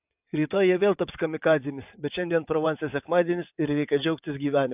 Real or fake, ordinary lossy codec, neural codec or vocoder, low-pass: fake; AAC, 32 kbps; vocoder, 22.05 kHz, 80 mel bands, WaveNeXt; 3.6 kHz